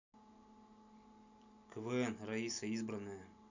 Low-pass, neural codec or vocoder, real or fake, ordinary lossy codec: 7.2 kHz; none; real; none